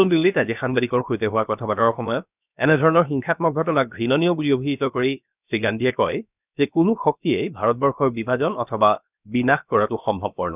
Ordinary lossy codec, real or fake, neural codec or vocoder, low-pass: none; fake; codec, 16 kHz, 0.7 kbps, FocalCodec; 3.6 kHz